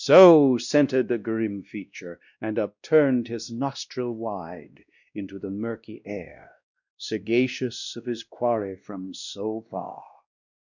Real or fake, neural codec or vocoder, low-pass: fake; codec, 16 kHz, 1 kbps, X-Codec, WavLM features, trained on Multilingual LibriSpeech; 7.2 kHz